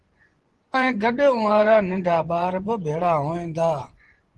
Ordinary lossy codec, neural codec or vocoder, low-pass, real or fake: Opus, 16 kbps; vocoder, 24 kHz, 100 mel bands, Vocos; 10.8 kHz; fake